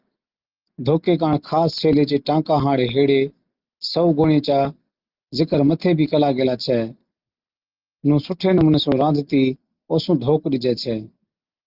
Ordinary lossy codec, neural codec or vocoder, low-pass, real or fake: Opus, 24 kbps; none; 5.4 kHz; real